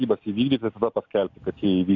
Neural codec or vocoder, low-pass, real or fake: none; 7.2 kHz; real